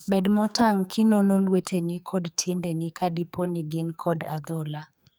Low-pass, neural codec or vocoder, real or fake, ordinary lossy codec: none; codec, 44.1 kHz, 2.6 kbps, SNAC; fake; none